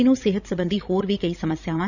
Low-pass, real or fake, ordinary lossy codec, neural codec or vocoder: 7.2 kHz; fake; none; codec, 16 kHz, 16 kbps, FreqCodec, larger model